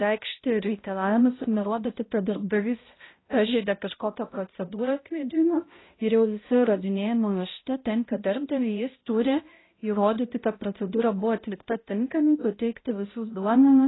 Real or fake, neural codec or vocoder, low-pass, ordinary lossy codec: fake; codec, 16 kHz, 0.5 kbps, X-Codec, HuBERT features, trained on balanced general audio; 7.2 kHz; AAC, 16 kbps